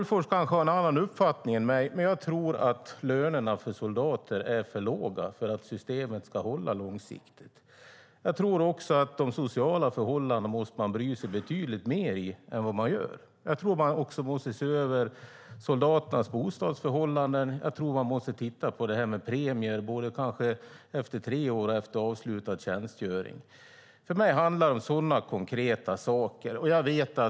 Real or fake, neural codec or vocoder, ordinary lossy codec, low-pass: real; none; none; none